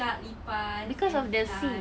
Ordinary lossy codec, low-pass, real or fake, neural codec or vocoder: none; none; real; none